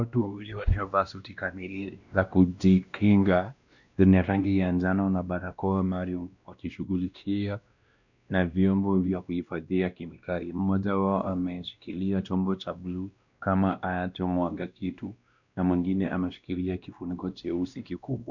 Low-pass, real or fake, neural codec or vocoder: 7.2 kHz; fake; codec, 16 kHz, 1 kbps, X-Codec, WavLM features, trained on Multilingual LibriSpeech